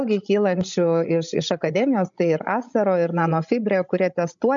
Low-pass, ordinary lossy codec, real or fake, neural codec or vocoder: 7.2 kHz; MP3, 96 kbps; fake; codec, 16 kHz, 16 kbps, FreqCodec, larger model